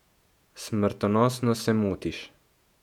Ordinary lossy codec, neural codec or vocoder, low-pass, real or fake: none; vocoder, 48 kHz, 128 mel bands, Vocos; 19.8 kHz; fake